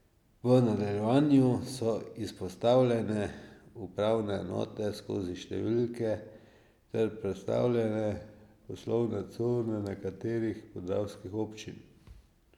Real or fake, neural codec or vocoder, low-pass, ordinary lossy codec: real; none; 19.8 kHz; none